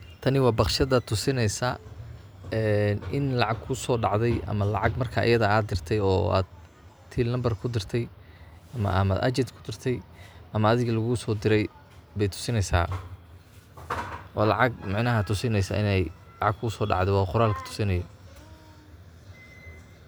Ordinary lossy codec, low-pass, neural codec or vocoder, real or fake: none; none; none; real